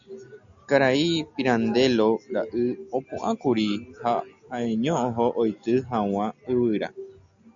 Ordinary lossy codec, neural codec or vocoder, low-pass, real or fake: MP3, 96 kbps; none; 7.2 kHz; real